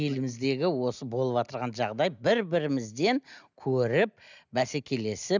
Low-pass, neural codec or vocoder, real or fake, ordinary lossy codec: 7.2 kHz; none; real; none